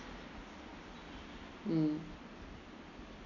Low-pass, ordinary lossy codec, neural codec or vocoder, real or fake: 7.2 kHz; none; none; real